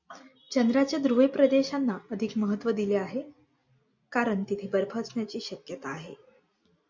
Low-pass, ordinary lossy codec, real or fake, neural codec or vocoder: 7.2 kHz; MP3, 48 kbps; real; none